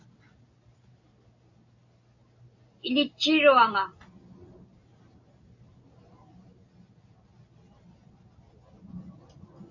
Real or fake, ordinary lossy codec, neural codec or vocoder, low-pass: real; AAC, 48 kbps; none; 7.2 kHz